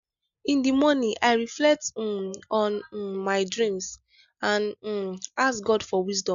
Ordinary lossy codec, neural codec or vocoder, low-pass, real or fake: none; none; 7.2 kHz; real